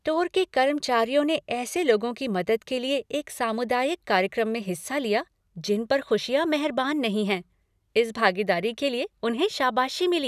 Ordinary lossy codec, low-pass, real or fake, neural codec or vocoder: none; 14.4 kHz; real; none